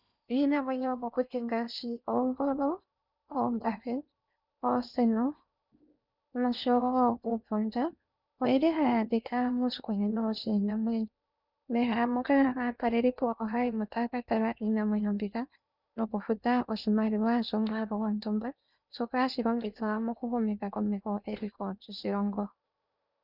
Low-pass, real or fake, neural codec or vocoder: 5.4 kHz; fake; codec, 16 kHz in and 24 kHz out, 0.8 kbps, FocalCodec, streaming, 65536 codes